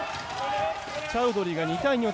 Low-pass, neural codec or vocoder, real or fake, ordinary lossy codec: none; none; real; none